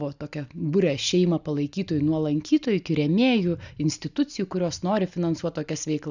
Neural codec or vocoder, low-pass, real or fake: none; 7.2 kHz; real